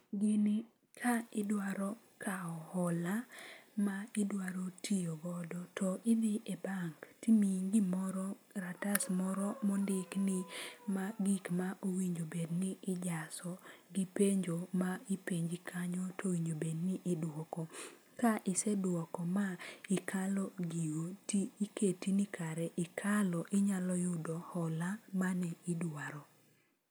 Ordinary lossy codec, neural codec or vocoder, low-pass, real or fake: none; none; none; real